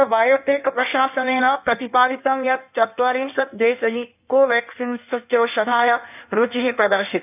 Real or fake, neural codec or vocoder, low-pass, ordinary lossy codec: fake; codec, 16 kHz in and 24 kHz out, 1.1 kbps, FireRedTTS-2 codec; 3.6 kHz; none